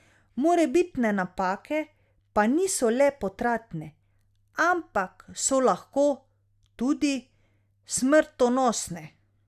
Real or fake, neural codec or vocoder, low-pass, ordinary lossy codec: real; none; 14.4 kHz; Opus, 64 kbps